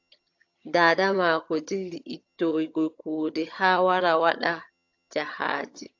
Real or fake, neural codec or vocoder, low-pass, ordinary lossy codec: fake; vocoder, 22.05 kHz, 80 mel bands, HiFi-GAN; 7.2 kHz; AAC, 48 kbps